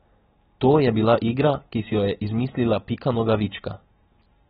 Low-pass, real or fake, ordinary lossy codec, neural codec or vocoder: 7.2 kHz; real; AAC, 16 kbps; none